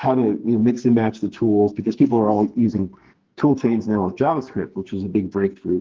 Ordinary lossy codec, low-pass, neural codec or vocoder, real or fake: Opus, 16 kbps; 7.2 kHz; codec, 44.1 kHz, 2.6 kbps, SNAC; fake